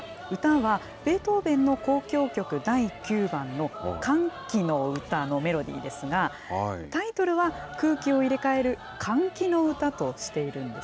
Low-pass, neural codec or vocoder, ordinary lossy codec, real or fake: none; none; none; real